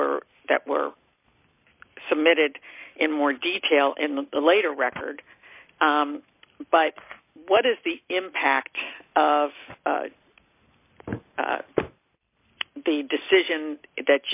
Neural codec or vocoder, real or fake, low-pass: none; real; 3.6 kHz